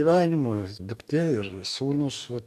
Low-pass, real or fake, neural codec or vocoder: 14.4 kHz; fake; codec, 44.1 kHz, 2.6 kbps, DAC